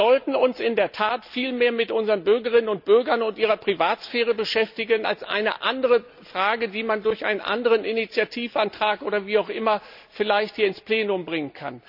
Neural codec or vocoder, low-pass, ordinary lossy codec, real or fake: none; 5.4 kHz; none; real